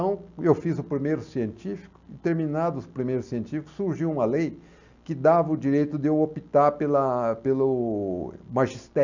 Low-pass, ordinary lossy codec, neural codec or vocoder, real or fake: 7.2 kHz; none; none; real